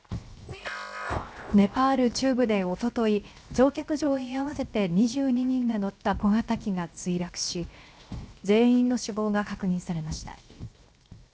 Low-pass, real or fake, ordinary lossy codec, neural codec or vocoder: none; fake; none; codec, 16 kHz, 0.7 kbps, FocalCodec